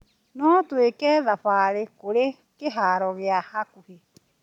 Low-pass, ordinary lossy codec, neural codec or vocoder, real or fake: 19.8 kHz; none; none; real